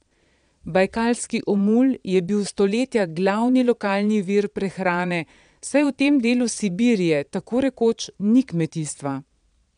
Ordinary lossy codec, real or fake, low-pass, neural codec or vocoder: none; fake; 9.9 kHz; vocoder, 22.05 kHz, 80 mel bands, Vocos